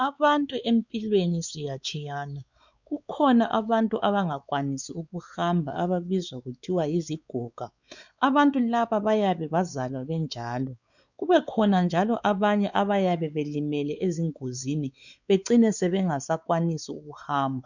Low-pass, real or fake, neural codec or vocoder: 7.2 kHz; fake; codec, 16 kHz, 4 kbps, X-Codec, WavLM features, trained on Multilingual LibriSpeech